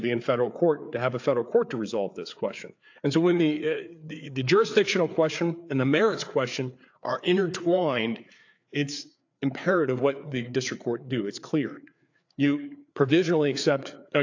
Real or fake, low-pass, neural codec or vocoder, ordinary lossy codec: fake; 7.2 kHz; codec, 16 kHz, 4 kbps, FreqCodec, larger model; AAC, 48 kbps